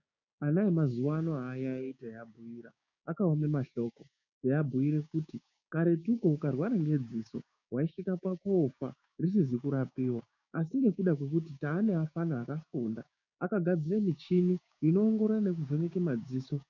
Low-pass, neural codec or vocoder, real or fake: 7.2 kHz; codec, 16 kHz, 6 kbps, DAC; fake